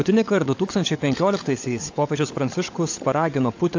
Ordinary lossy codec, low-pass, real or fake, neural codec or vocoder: AAC, 48 kbps; 7.2 kHz; fake; codec, 16 kHz, 16 kbps, FunCodec, trained on LibriTTS, 50 frames a second